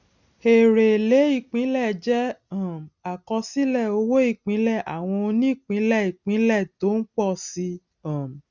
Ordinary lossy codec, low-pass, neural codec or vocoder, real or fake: none; 7.2 kHz; none; real